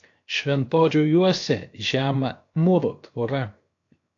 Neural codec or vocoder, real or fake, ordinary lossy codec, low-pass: codec, 16 kHz, 0.7 kbps, FocalCodec; fake; AAC, 48 kbps; 7.2 kHz